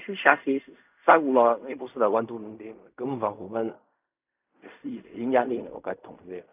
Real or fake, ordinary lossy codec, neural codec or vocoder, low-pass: fake; none; codec, 16 kHz in and 24 kHz out, 0.4 kbps, LongCat-Audio-Codec, fine tuned four codebook decoder; 3.6 kHz